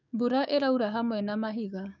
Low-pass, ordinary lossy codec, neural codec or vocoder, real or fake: 7.2 kHz; none; codec, 16 kHz, 6 kbps, DAC; fake